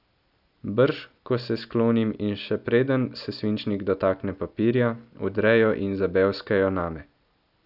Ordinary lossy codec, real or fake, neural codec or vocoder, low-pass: none; real; none; 5.4 kHz